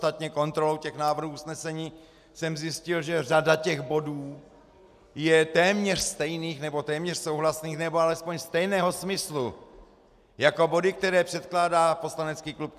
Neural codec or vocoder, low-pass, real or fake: none; 14.4 kHz; real